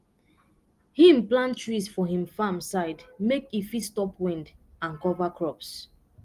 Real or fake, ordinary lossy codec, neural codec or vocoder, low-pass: real; Opus, 24 kbps; none; 14.4 kHz